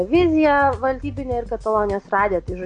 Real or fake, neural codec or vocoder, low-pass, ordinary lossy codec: real; none; 9.9 kHz; MP3, 48 kbps